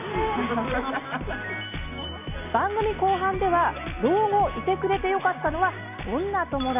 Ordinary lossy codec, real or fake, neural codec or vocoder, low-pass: none; real; none; 3.6 kHz